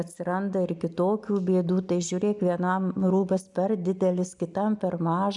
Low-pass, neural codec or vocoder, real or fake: 10.8 kHz; none; real